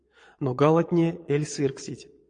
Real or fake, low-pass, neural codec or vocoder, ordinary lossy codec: fake; 9.9 kHz; vocoder, 22.05 kHz, 80 mel bands, Vocos; AAC, 48 kbps